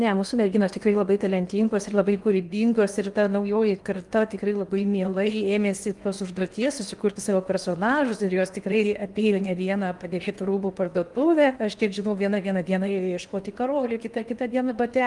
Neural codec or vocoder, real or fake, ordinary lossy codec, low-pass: codec, 16 kHz in and 24 kHz out, 0.8 kbps, FocalCodec, streaming, 65536 codes; fake; Opus, 32 kbps; 10.8 kHz